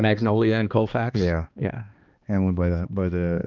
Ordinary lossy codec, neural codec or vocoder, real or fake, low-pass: Opus, 24 kbps; codec, 16 kHz, 2 kbps, X-Codec, HuBERT features, trained on balanced general audio; fake; 7.2 kHz